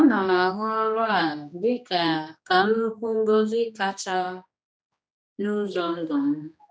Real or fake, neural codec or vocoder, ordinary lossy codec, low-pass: fake; codec, 16 kHz, 1 kbps, X-Codec, HuBERT features, trained on general audio; none; none